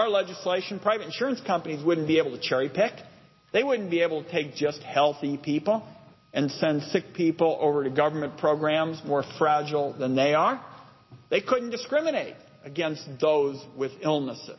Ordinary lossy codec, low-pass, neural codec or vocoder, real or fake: MP3, 24 kbps; 7.2 kHz; none; real